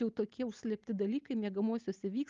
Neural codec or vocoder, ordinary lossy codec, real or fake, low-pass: none; Opus, 32 kbps; real; 7.2 kHz